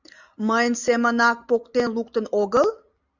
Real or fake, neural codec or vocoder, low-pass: real; none; 7.2 kHz